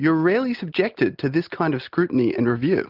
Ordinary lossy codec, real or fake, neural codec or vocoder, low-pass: Opus, 32 kbps; real; none; 5.4 kHz